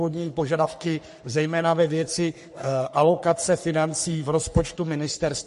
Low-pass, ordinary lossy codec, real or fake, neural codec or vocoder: 14.4 kHz; MP3, 48 kbps; fake; codec, 44.1 kHz, 3.4 kbps, Pupu-Codec